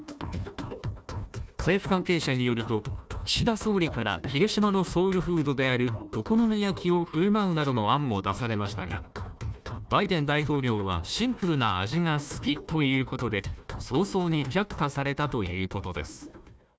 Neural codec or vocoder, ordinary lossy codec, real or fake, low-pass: codec, 16 kHz, 1 kbps, FunCodec, trained on Chinese and English, 50 frames a second; none; fake; none